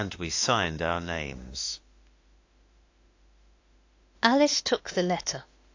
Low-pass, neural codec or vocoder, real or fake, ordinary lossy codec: 7.2 kHz; autoencoder, 48 kHz, 32 numbers a frame, DAC-VAE, trained on Japanese speech; fake; AAC, 48 kbps